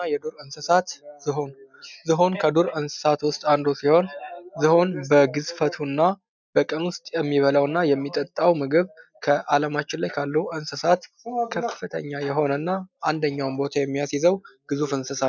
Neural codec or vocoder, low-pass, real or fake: none; 7.2 kHz; real